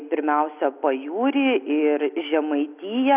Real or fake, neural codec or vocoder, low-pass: real; none; 3.6 kHz